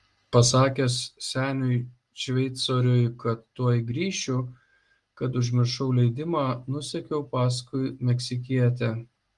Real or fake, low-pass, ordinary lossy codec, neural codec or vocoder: real; 10.8 kHz; Opus, 24 kbps; none